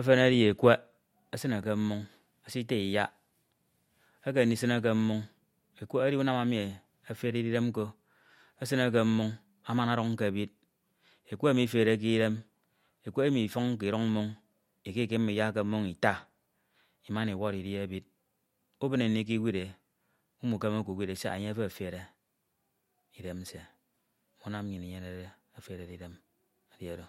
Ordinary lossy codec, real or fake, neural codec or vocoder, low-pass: MP3, 64 kbps; real; none; 19.8 kHz